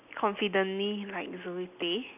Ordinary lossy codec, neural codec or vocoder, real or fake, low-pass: none; none; real; 3.6 kHz